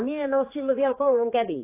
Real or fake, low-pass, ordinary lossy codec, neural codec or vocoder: fake; 3.6 kHz; AAC, 24 kbps; codec, 16 kHz, 4 kbps, X-Codec, HuBERT features, trained on general audio